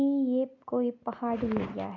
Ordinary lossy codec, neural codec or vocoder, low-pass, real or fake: AAC, 32 kbps; none; 7.2 kHz; real